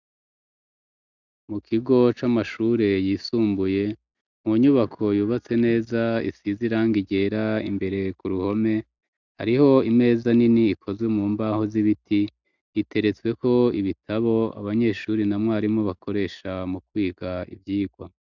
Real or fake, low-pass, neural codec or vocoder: real; 7.2 kHz; none